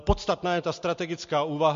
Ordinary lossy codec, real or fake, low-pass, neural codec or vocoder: MP3, 48 kbps; real; 7.2 kHz; none